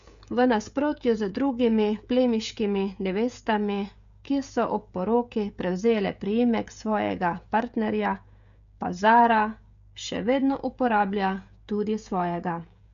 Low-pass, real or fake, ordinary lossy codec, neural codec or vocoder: 7.2 kHz; fake; AAC, 96 kbps; codec, 16 kHz, 16 kbps, FreqCodec, smaller model